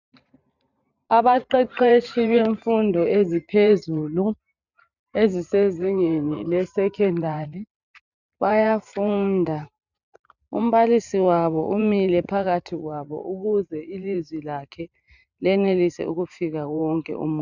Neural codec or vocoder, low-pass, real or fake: vocoder, 44.1 kHz, 128 mel bands every 512 samples, BigVGAN v2; 7.2 kHz; fake